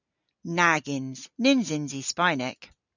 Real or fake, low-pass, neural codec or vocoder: real; 7.2 kHz; none